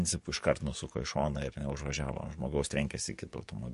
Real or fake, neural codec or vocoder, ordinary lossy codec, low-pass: fake; codec, 44.1 kHz, 7.8 kbps, DAC; MP3, 48 kbps; 14.4 kHz